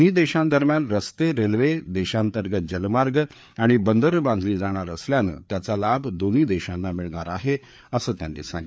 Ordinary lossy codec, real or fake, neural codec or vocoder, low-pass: none; fake; codec, 16 kHz, 4 kbps, FreqCodec, larger model; none